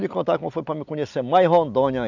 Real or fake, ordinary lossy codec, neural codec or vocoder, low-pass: real; none; none; 7.2 kHz